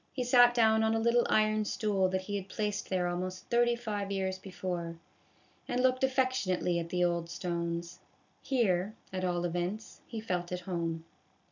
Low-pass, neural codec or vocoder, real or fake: 7.2 kHz; none; real